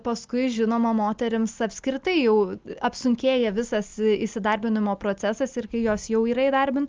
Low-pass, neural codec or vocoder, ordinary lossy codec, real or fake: 7.2 kHz; none; Opus, 32 kbps; real